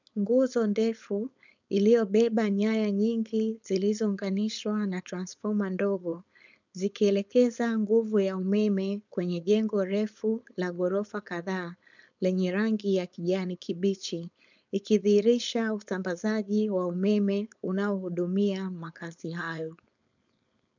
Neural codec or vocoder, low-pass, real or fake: codec, 16 kHz, 4.8 kbps, FACodec; 7.2 kHz; fake